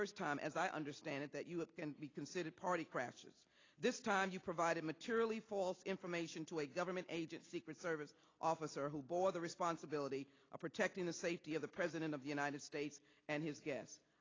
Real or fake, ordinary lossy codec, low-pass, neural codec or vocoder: real; AAC, 32 kbps; 7.2 kHz; none